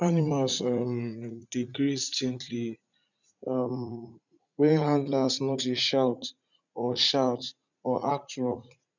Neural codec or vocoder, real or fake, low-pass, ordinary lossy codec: vocoder, 44.1 kHz, 80 mel bands, Vocos; fake; 7.2 kHz; none